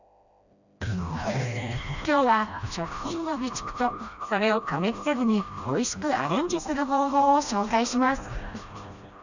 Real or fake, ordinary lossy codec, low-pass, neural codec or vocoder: fake; none; 7.2 kHz; codec, 16 kHz, 1 kbps, FreqCodec, smaller model